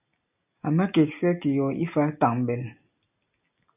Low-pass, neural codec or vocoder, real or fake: 3.6 kHz; none; real